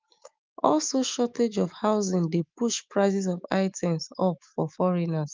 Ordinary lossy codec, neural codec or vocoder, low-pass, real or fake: Opus, 32 kbps; autoencoder, 48 kHz, 128 numbers a frame, DAC-VAE, trained on Japanese speech; 7.2 kHz; fake